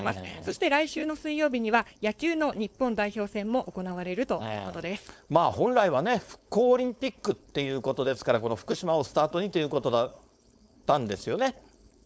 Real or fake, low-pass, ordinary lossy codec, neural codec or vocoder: fake; none; none; codec, 16 kHz, 4.8 kbps, FACodec